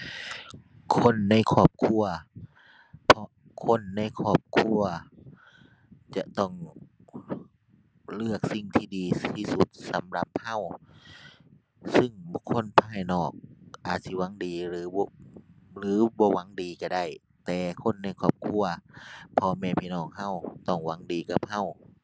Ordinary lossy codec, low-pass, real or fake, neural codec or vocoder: none; none; real; none